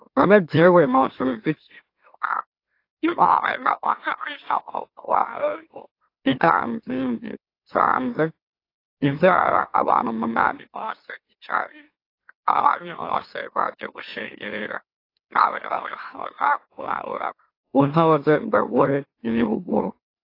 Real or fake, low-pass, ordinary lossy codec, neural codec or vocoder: fake; 5.4 kHz; AAC, 32 kbps; autoencoder, 44.1 kHz, a latent of 192 numbers a frame, MeloTTS